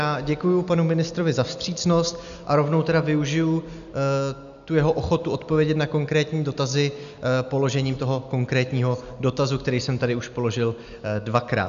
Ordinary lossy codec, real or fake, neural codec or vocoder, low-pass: MP3, 96 kbps; real; none; 7.2 kHz